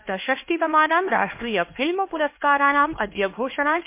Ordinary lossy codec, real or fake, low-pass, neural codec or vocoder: MP3, 24 kbps; fake; 3.6 kHz; codec, 16 kHz, 2 kbps, X-Codec, HuBERT features, trained on LibriSpeech